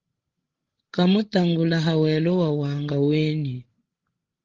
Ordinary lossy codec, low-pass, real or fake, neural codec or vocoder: Opus, 16 kbps; 7.2 kHz; fake; codec, 16 kHz, 16 kbps, FreqCodec, larger model